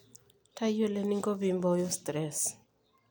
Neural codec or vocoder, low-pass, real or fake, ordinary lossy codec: none; none; real; none